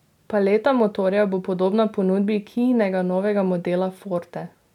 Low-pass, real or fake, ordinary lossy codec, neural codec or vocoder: 19.8 kHz; real; none; none